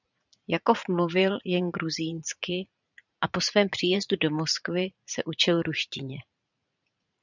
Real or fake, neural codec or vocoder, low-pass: fake; vocoder, 44.1 kHz, 80 mel bands, Vocos; 7.2 kHz